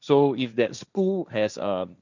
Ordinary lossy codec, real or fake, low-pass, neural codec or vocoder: none; fake; 7.2 kHz; codec, 16 kHz, 1.1 kbps, Voila-Tokenizer